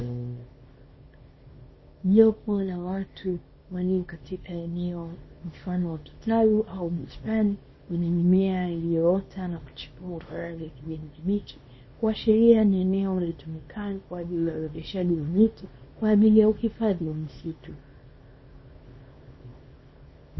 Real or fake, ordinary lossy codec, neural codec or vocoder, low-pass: fake; MP3, 24 kbps; codec, 24 kHz, 0.9 kbps, WavTokenizer, small release; 7.2 kHz